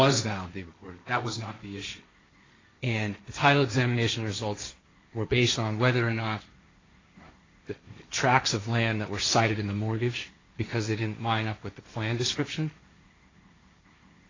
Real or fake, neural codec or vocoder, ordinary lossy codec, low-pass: fake; codec, 16 kHz, 1.1 kbps, Voila-Tokenizer; AAC, 32 kbps; 7.2 kHz